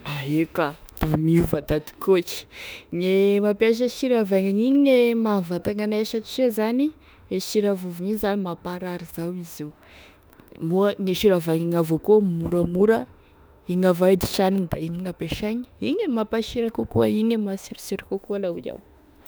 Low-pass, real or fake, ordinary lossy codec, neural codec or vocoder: none; fake; none; autoencoder, 48 kHz, 32 numbers a frame, DAC-VAE, trained on Japanese speech